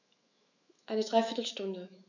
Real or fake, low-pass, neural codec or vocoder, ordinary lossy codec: fake; 7.2 kHz; autoencoder, 48 kHz, 128 numbers a frame, DAC-VAE, trained on Japanese speech; none